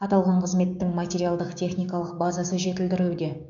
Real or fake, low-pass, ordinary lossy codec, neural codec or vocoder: fake; 7.2 kHz; none; codec, 16 kHz, 6 kbps, DAC